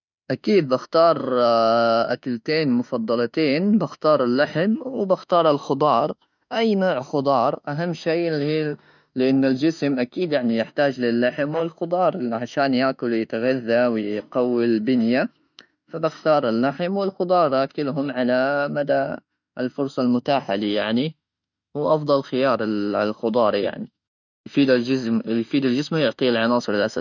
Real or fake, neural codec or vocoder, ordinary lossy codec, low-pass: fake; autoencoder, 48 kHz, 32 numbers a frame, DAC-VAE, trained on Japanese speech; none; 7.2 kHz